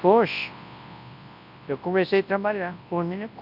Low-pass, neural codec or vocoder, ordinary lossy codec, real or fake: 5.4 kHz; codec, 24 kHz, 0.9 kbps, WavTokenizer, large speech release; none; fake